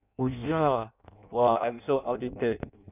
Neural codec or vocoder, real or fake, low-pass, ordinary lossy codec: codec, 16 kHz in and 24 kHz out, 0.6 kbps, FireRedTTS-2 codec; fake; 3.6 kHz; none